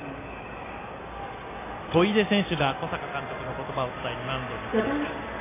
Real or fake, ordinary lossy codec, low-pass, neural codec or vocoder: real; AAC, 16 kbps; 3.6 kHz; none